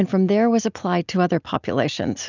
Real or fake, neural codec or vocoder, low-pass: real; none; 7.2 kHz